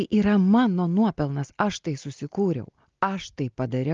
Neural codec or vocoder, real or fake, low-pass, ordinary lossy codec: none; real; 7.2 kHz; Opus, 32 kbps